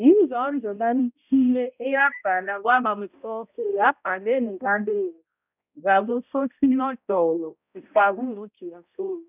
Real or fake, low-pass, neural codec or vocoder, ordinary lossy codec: fake; 3.6 kHz; codec, 16 kHz, 0.5 kbps, X-Codec, HuBERT features, trained on balanced general audio; none